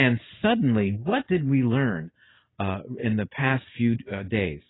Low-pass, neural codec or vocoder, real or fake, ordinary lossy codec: 7.2 kHz; none; real; AAC, 16 kbps